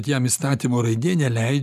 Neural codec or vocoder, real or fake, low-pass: none; real; 14.4 kHz